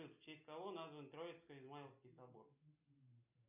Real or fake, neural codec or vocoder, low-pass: real; none; 3.6 kHz